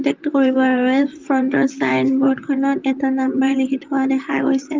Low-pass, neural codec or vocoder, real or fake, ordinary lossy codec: 7.2 kHz; vocoder, 44.1 kHz, 128 mel bands, Pupu-Vocoder; fake; Opus, 32 kbps